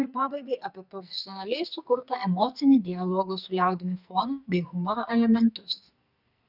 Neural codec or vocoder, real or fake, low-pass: codec, 44.1 kHz, 2.6 kbps, SNAC; fake; 5.4 kHz